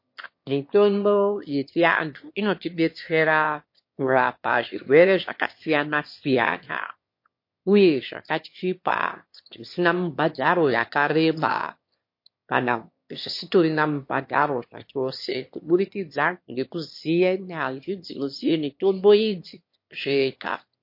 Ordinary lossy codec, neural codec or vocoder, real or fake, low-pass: MP3, 32 kbps; autoencoder, 22.05 kHz, a latent of 192 numbers a frame, VITS, trained on one speaker; fake; 5.4 kHz